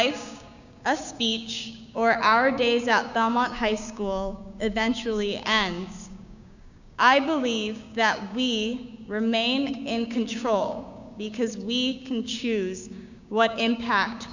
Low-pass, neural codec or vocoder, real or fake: 7.2 kHz; codec, 16 kHz, 6 kbps, DAC; fake